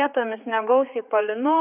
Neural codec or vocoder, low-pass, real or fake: codec, 16 kHz, 4 kbps, X-Codec, HuBERT features, trained on general audio; 3.6 kHz; fake